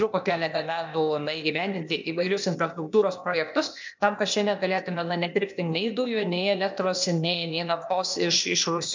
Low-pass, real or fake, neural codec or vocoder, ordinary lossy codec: 7.2 kHz; fake; codec, 16 kHz, 0.8 kbps, ZipCodec; MP3, 64 kbps